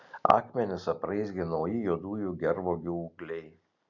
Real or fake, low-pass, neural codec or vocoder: real; 7.2 kHz; none